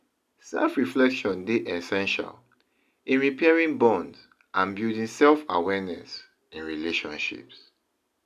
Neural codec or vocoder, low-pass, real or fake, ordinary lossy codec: none; 14.4 kHz; real; none